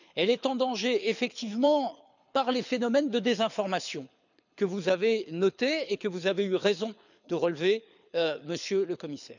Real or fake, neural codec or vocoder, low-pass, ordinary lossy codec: fake; codec, 24 kHz, 6 kbps, HILCodec; 7.2 kHz; none